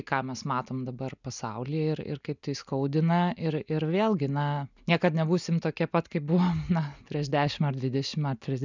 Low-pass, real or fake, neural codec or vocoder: 7.2 kHz; real; none